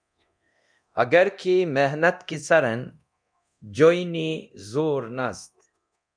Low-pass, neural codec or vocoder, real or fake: 9.9 kHz; codec, 24 kHz, 0.9 kbps, DualCodec; fake